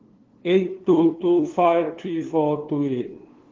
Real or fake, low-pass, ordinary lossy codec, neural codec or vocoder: fake; 7.2 kHz; Opus, 16 kbps; codec, 16 kHz, 2 kbps, FunCodec, trained on LibriTTS, 25 frames a second